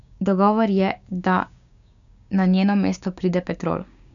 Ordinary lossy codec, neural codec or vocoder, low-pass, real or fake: none; codec, 16 kHz, 6 kbps, DAC; 7.2 kHz; fake